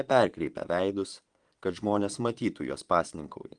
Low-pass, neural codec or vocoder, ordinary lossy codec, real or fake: 9.9 kHz; vocoder, 22.05 kHz, 80 mel bands, Vocos; Opus, 24 kbps; fake